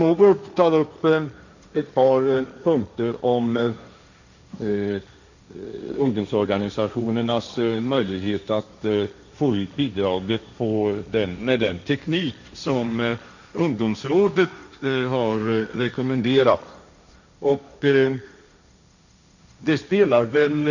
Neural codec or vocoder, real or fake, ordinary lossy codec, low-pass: codec, 16 kHz, 1.1 kbps, Voila-Tokenizer; fake; none; 7.2 kHz